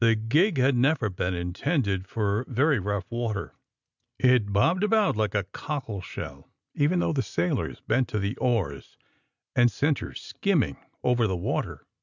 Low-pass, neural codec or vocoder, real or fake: 7.2 kHz; none; real